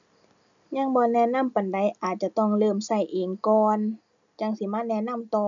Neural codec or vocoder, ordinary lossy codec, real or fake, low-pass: none; none; real; 7.2 kHz